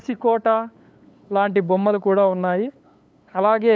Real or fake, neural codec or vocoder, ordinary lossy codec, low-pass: fake; codec, 16 kHz, 8 kbps, FunCodec, trained on LibriTTS, 25 frames a second; none; none